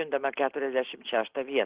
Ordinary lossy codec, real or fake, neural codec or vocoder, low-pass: Opus, 16 kbps; real; none; 3.6 kHz